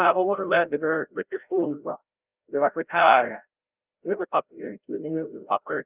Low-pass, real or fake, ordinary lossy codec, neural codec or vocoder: 3.6 kHz; fake; Opus, 32 kbps; codec, 16 kHz, 0.5 kbps, FreqCodec, larger model